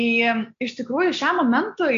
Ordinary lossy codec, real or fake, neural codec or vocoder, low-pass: AAC, 48 kbps; real; none; 7.2 kHz